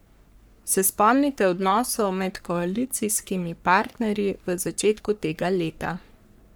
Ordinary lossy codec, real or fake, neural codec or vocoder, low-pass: none; fake; codec, 44.1 kHz, 3.4 kbps, Pupu-Codec; none